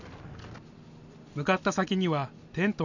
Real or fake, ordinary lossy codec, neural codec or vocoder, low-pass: real; none; none; 7.2 kHz